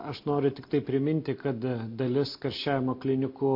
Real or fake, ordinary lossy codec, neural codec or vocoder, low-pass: real; MP3, 32 kbps; none; 5.4 kHz